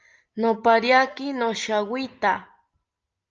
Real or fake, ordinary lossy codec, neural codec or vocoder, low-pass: real; Opus, 24 kbps; none; 7.2 kHz